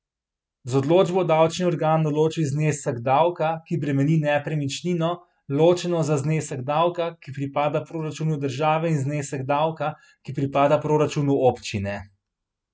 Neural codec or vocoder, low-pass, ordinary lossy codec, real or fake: none; none; none; real